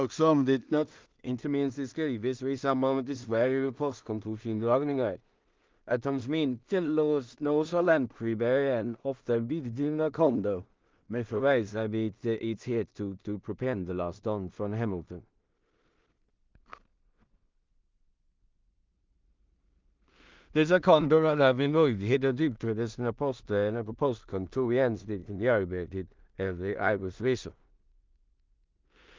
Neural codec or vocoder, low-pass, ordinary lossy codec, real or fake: codec, 16 kHz in and 24 kHz out, 0.4 kbps, LongCat-Audio-Codec, two codebook decoder; 7.2 kHz; Opus, 24 kbps; fake